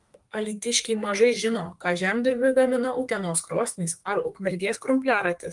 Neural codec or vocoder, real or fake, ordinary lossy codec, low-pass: codec, 32 kHz, 1.9 kbps, SNAC; fake; Opus, 32 kbps; 10.8 kHz